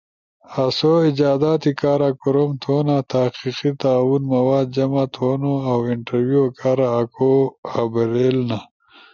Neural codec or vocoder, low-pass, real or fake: none; 7.2 kHz; real